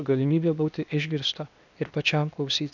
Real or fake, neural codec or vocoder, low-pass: fake; codec, 16 kHz, 0.8 kbps, ZipCodec; 7.2 kHz